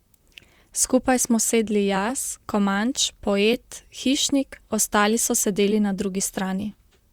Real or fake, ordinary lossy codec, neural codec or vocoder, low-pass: fake; Opus, 64 kbps; vocoder, 44.1 kHz, 128 mel bands, Pupu-Vocoder; 19.8 kHz